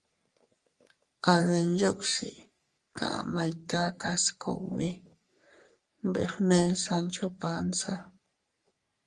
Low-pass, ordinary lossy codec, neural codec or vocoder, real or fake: 10.8 kHz; Opus, 32 kbps; codec, 44.1 kHz, 3.4 kbps, Pupu-Codec; fake